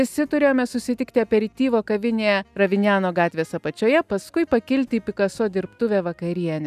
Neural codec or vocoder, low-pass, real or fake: none; 14.4 kHz; real